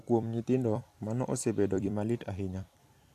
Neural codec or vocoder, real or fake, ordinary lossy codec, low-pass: vocoder, 44.1 kHz, 128 mel bands every 256 samples, BigVGAN v2; fake; AAC, 64 kbps; 14.4 kHz